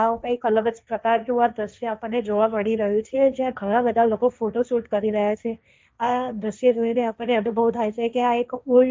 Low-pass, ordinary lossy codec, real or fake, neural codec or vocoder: none; none; fake; codec, 16 kHz, 1.1 kbps, Voila-Tokenizer